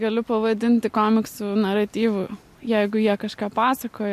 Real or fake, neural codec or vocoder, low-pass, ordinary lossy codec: real; none; 14.4 kHz; MP3, 64 kbps